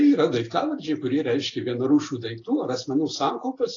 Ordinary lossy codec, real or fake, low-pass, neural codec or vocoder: AAC, 32 kbps; real; 7.2 kHz; none